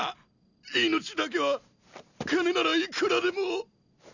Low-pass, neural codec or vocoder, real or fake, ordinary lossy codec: 7.2 kHz; none; real; none